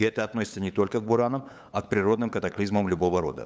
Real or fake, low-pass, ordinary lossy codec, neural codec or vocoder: fake; none; none; codec, 16 kHz, 8 kbps, FunCodec, trained on LibriTTS, 25 frames a second